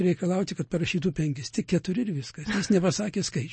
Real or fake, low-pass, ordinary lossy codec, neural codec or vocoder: real; 9.9 kHz; MP3, 32 kbps; none